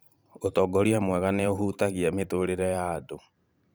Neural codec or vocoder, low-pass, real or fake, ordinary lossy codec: vocoder, 44.1 kHz, 128 mel bands every 512 samples, BigVGAN v2; none; fake; none